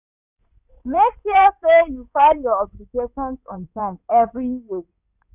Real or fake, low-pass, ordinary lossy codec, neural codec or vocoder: fake; 3.6 kHz; none; codec, 16 kHz in and 24 kHz out, 2.2 kbps, FireRedTTS-2 codec